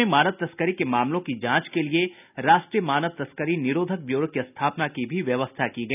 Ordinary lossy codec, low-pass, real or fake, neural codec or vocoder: none; 3.6 kHz; real; none